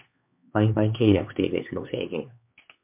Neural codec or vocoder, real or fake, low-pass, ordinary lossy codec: codec, 16 kHz, 4 kbps, X-Codec, HuBERT features, trained on LibriSpeech; fake; 3.6 kHz; MP3, 32 kbps